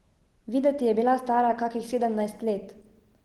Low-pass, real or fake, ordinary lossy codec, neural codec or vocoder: 19.8 kHz; real; Opus, 16 kbps; none